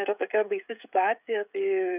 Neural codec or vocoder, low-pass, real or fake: codec, 16 kHz, 16 kbps, FreqCodec, smaller model; 3.6 kHz; fake